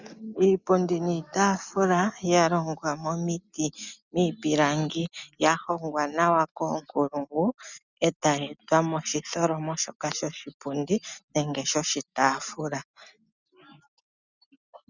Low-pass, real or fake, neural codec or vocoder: 7.2 kHz; real; none